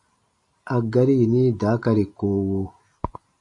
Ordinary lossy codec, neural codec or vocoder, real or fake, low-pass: AAC, 64 kbps; none; real; 10.8 kHz